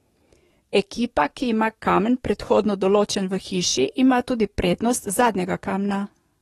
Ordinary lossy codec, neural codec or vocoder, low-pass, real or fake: AAC, 32 kbps; codec, 44.1 kHz, 7.8 kbps, DAC; 19.8 kHz; fake